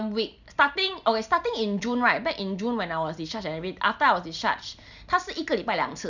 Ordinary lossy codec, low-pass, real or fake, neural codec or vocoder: none; 7.2 kHz; real; none